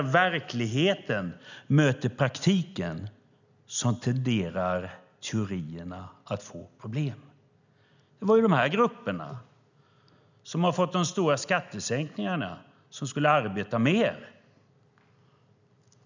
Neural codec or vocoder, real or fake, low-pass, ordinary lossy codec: none; real; 7.2 kHz; none